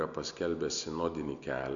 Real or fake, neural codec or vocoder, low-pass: real; none; 7.2 kHz